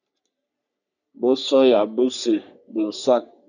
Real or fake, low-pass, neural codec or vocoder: fake; 7.2 kHz; codec, 44.1 kHz, 3.4 kbps, Pupu-Codec